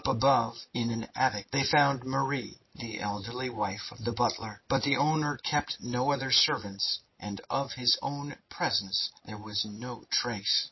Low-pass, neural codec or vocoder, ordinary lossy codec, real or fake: 7.2 kHz; none; MP3, 24 kbps; real